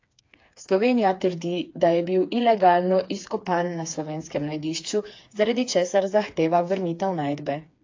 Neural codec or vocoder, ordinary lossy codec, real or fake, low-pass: codec, 16 kHz, 4 kbps, FreqCodec, smaller model; AAC, 48 kbps; fake; 7.2 kHz